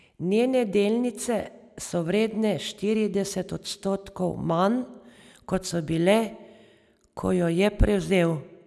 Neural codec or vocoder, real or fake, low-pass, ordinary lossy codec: none; real; none; none